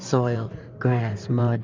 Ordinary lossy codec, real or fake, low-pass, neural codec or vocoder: MP3, 64 kbps; fake; 7.2 kHz; codec, 16 kHz in and 24 kHz out, 2.2 kbps, FireRedTTS-2 codec